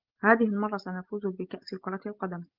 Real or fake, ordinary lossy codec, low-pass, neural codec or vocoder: real; Opus, 32 kbps; 5.4 kHz; none